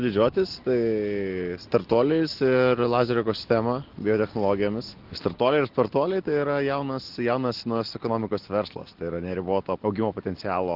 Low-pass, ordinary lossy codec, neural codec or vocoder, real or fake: 5.4 kHz; Opus, 16 kbps; none; real